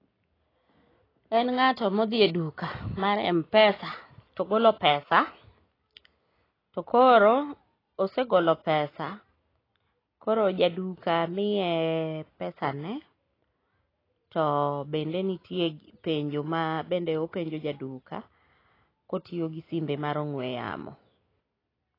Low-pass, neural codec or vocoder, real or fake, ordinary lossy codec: 5.4 kHz; none; real; AAC, 24 kbps